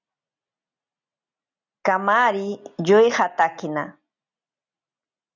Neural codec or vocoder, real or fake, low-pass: none; real; 7.2 kHz